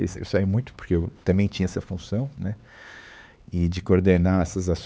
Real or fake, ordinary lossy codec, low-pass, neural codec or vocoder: fake; none; none; codec, 16 kHz, 4 kbps, X-Codec, HuBERT features, trained on LibriSpeech